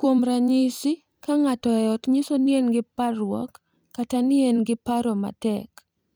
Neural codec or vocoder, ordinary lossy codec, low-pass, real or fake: vocoder, 44.1 kHz, 128 mel bands every 256 samples, BigVGAN v2; none; none; fake